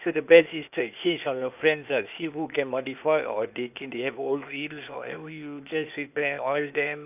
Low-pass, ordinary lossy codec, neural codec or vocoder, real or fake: 3.6 kHz; none; codec, 16 kHz, 0.8 kbps, ZipCodec; fake